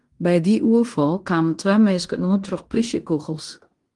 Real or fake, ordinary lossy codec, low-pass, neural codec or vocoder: fake; Opus, 24 kbps; 10.8 kHz; codec, 16 kHz in and 24 kHz out, 0.9 kbps, LongCat-Audio-Codec, fine tuned four codebook decoder